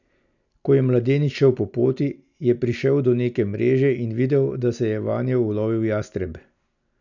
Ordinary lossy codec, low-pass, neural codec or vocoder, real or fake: none; 7.2 kHz; none; real